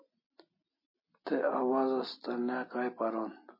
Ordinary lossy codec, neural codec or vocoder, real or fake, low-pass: MP3, 24 kbps; none; real; 5.4 kHz